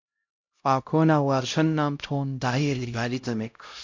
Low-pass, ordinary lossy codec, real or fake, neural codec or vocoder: 7.2 kHz; MP3, 48 kbps; fake; codec, 16 kHz, 0.5 kbps, X-Codec, HuBERT features, trained on LibriSpeech